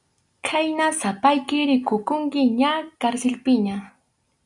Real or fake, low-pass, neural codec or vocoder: real; 10.8 kHz; none